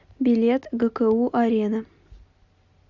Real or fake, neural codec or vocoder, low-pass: real; none; 7.2 kHz